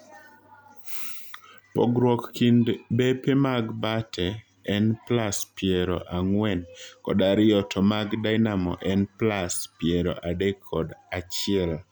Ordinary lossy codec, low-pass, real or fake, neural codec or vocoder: none; none; real; none